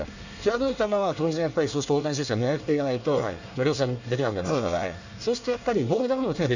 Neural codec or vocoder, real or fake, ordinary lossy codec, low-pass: codec, 24 kHz, 1 kbps, SNAC; fake; none; 7.2 kHz